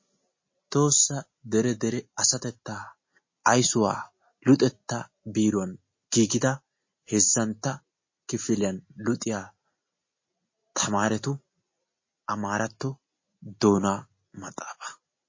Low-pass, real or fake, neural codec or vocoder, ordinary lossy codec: 7.2 kHz; real; none; MP3, 32 kbps